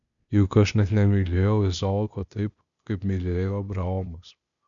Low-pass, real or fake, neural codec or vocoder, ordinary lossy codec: 7.2 kHz; fake; codec, 16 kHz, 0.8 kbps, ZipCodec; MP3, 96 kbps